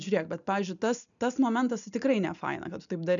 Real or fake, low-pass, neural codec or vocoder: real; 7.2 kHz; none